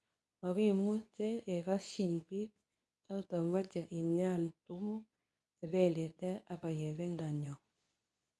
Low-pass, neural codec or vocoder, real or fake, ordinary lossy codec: none; codec, 24 kHz, 0.9 kbps, WavTokenizer, medium speech release version 2; fake; none